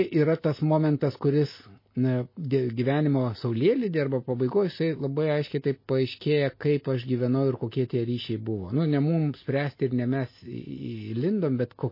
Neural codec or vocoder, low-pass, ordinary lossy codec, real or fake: none; 5.4 kHz; MP3, 24 kbps; real